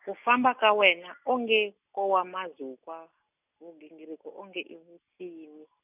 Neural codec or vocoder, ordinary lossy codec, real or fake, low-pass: none; none; real; 3.6 kHz